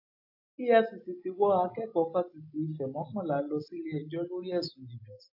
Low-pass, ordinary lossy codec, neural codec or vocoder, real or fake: 5.4 kHz; AAC, 48 kbps; none; real